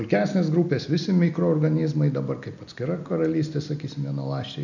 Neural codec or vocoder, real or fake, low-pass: none; real; 7.2 kHz